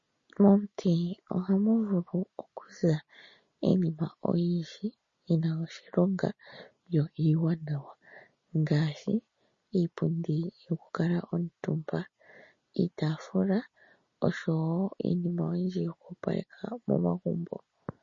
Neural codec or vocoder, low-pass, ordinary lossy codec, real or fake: none; 7.2 kHz; MP3, 32 kbps; real